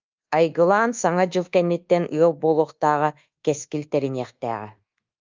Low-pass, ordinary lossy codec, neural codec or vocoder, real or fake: 7.2 kHz; Opus, 24 kbps; codec, 24 kHz, 1.2 kbps, DualCodec; fake